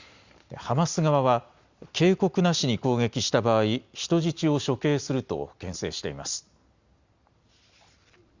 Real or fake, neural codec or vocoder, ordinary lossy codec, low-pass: real; none; Opus, 64 kbps; 7.2 kHz